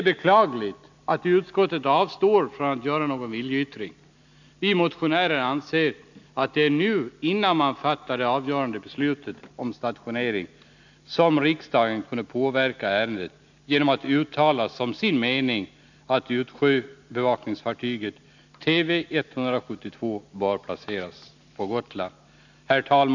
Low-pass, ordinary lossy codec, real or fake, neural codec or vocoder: 7.2 kHz; none; real; none